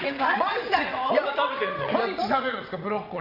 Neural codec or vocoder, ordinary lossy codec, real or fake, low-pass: vocoder, 22.05 kHz, 80 mel bands, WaveNeXt; none; fake; 5.4 kHz